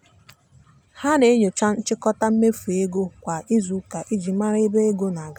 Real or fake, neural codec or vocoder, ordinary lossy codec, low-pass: real; none; none; 19.8 kHz